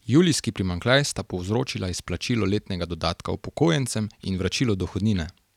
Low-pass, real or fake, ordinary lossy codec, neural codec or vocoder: 19.8 kHz; real; none; none